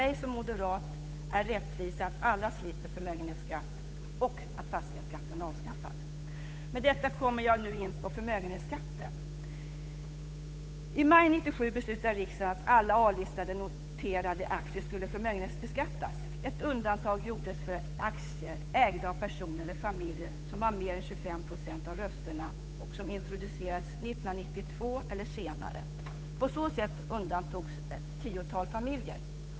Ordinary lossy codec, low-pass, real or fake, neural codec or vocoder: none; none; fake; codec, 16 kHz, 8 kbps, FunCodec, trained on Chinese and English, 25 frames a second